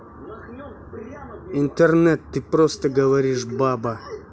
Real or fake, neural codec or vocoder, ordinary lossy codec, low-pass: real; none; none; none